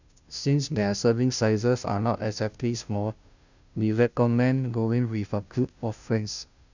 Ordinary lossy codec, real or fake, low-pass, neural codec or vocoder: none; fake; 7.2 kHz; codec, 16 kHz, 0.5 kbps, FunCodec, trained on Chinese and English, 25 frames a second